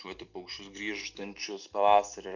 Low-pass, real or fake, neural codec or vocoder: 7.2 kHz; real; none